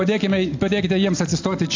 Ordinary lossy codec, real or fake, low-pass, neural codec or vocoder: AAC, 48 kbps; real; 7.2 kHz; none